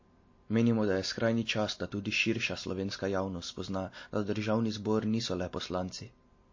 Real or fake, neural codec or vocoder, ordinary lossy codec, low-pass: real; none; MP3, 32 kbps; 7.2 kHz